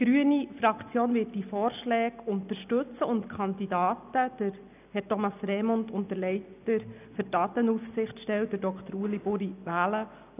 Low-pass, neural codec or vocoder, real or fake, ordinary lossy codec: 3.6 kHz; none; real; none